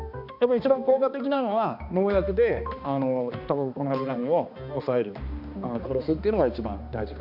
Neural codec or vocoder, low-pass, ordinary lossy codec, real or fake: codec, 16 kHz, 2 kbps, X-Codec, HuBERT features, trained on balanced general audio; 5.4 kHz; none; fake